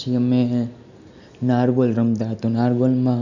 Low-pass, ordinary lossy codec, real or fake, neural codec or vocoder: 7.2 kHz; none; real; none